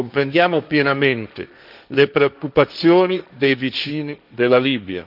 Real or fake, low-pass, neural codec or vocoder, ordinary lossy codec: fake; 5.4 kHz; codec, 16 kHz, 1.1 kbps, Voila-Tokenizer; none